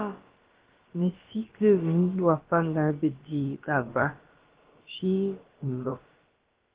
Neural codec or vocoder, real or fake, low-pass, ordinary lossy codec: codec, 16 kHz, about 1 kbps, DyCAST, with the encoder's durations; fake; 3.6 kHz; Opus, 16 kbps